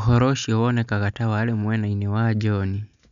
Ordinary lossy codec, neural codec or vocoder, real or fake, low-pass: none; none; real; 7.2 kHz